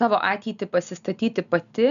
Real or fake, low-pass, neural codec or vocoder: real; 7.2 kHz; none